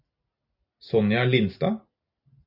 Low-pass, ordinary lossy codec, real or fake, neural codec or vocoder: 5.4 kHz; AAC, 48 kbps; real; none